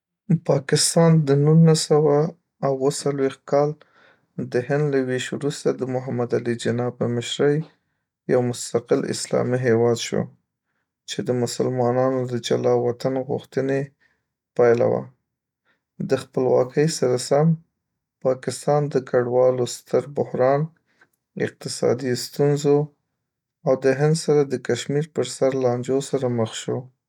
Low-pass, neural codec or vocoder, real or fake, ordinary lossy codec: 19.8 kHz; none; real; none